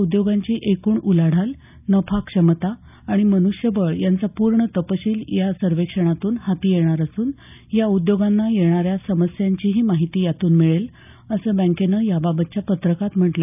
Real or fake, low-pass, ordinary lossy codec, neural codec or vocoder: real; 3.6 kHz; none; none